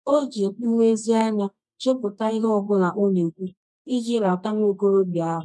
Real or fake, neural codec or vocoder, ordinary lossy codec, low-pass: fake; codec, 24 kHz, 0.9 kbps, WavTokenizer, medium music audio release; none; none